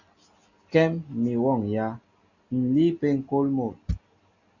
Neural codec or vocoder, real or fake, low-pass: none; real; 7.2 kHz